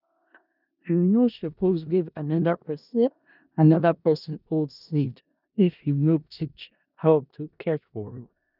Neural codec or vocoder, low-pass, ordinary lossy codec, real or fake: codec, 16 kHz in and 24 kHz out, 0.4 kbps, LongCat-Audio-Codec, four codebook decoder; 5.4 kHz; none; fake